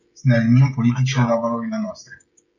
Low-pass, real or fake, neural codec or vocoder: 7.2 kHz; fake; codec, 16 kHz, 16 kbps, FreqCodec, smaller model